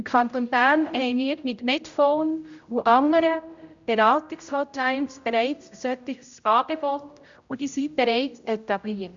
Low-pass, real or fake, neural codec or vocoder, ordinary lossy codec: 7.2 kHz; fake; codec, 16 kHz, 0.5 kbps, X-Codec, HuBERT features, trained on general audio; Opus, 64 kbps